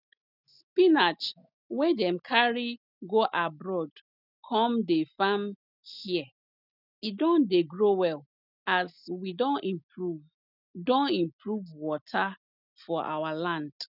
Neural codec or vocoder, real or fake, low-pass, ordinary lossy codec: none; real; 5.4 kHz; none